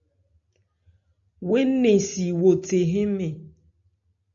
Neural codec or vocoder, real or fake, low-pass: none; real; 7.2 kHz